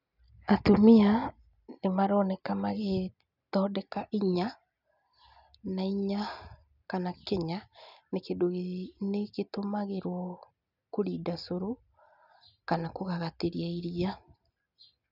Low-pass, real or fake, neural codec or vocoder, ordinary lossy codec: 5.4 kHz; real; none; none